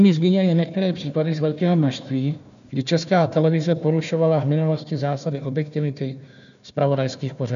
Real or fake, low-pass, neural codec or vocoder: fake; 7.2 kHz; codec, 16 kHz, 1 kbps, FunCodec, trained on Chinese and English, 50 frames a second